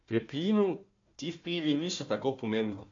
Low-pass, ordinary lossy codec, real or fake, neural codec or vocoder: 7.2 kHz; MP3, 48 kbps; fake; codec, 16 kHz, 1 kbps, FunCodec, trained on Chinese and English, 50 frames a second